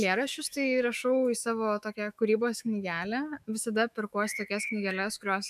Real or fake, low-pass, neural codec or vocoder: fake; 14.4 kHz; autoencoder, 48 kHz, 128 numbers a frame, DAC-VAE, trained on Japanese speech